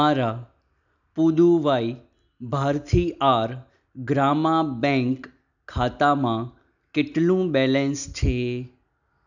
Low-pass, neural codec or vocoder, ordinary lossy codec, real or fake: 7.2 kHz; none; none; real